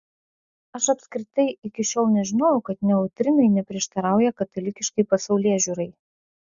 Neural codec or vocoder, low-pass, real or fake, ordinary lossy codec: none; 7.2 kHz; real; Opus, 64 kbps